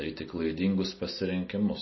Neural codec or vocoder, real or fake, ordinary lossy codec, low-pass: none; real; MP3, 24 kbps; 7.2 kHz